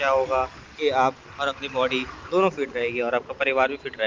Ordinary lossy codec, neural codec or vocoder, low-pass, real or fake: Opus, 32 kbps; none; 7.2 kHz; real